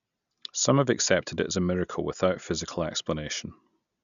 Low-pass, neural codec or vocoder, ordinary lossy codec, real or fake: 7.2 kHz; none; none; real